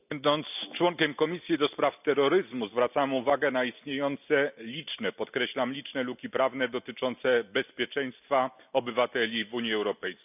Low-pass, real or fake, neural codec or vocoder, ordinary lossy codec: 3.6 kHz; real; none; none